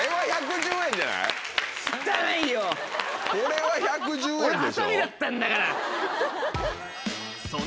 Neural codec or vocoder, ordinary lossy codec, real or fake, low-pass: none; none; real; none